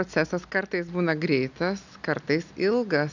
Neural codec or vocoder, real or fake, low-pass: none; real; 7.2 kHz